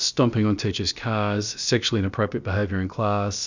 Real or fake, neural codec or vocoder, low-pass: fake; codec, 16 kHz, about 1 kbps, DyCAST, with the encoder's durations; 7.2 kHz